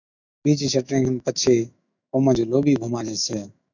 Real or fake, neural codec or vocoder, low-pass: fake; autoencoder, 48 kHz, 128 numbers a frame, DAC-VAE, trained on Japanese speech; 7.2 kHz